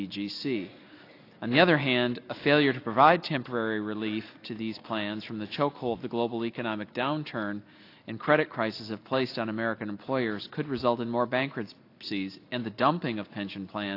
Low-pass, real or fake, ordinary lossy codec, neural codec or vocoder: 5.4 kHz; real; AAC, 32 kbps; none